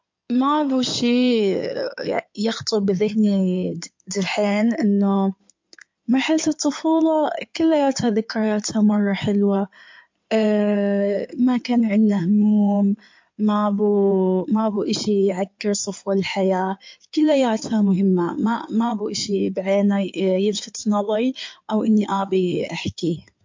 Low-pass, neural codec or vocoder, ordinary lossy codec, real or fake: 7.2 kHz; codec, 16 kHz in and 24 kHz out, 2.2 kbps, FireRedTTS-2 codec; MP3, 48 kbps; fake